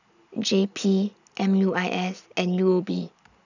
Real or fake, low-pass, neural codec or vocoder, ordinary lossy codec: real; 7.2 kHz; none; none